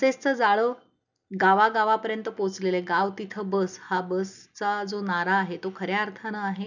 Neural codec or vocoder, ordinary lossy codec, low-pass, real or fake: none; none; 7.2 kHz; real